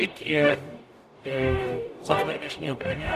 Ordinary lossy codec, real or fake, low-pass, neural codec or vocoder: AAC, 96 kbps; fake; 14.4 kHz; codec, 44.1 kHz, 0.9 kbps, DAC